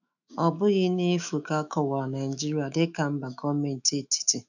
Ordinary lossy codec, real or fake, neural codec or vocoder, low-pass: none; fake; autoencoder, 48 kHz, 128 numbers a frame, DAC-VAE, trained on Japanese speech; 7.2 kHz